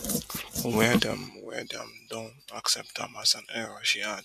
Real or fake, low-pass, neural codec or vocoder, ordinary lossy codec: real; 14.4 kHz; none; MP3, 96 kbps